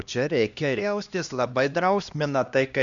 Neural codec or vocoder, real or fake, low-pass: codec, 16 kHz, 1 kbps, X-Codec, HuBERT features, trained on LibriSpeech; fake; 7.2 kHz